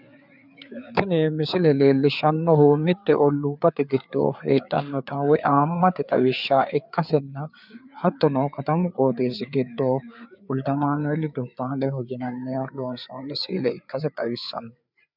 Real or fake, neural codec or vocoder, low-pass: fake; codec, 16 kHz, 4 kbps, FreqCodec, larger model; 5.4 kHz